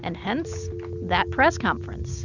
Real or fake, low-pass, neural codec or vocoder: real; 7.2 kHz; none